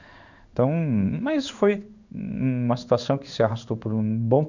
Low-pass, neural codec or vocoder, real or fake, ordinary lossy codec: 7.2 kHz; codec, 16 kHz in and 24 kHz out, 1 kbps, XY-Tokenizer; fake; none